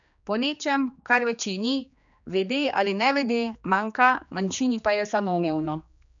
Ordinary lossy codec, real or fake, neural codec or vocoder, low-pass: none; fake; codec, 16 kHz, 2 kbps, X-Codec, HuBERT features, trained on general audio; 7.2 kHz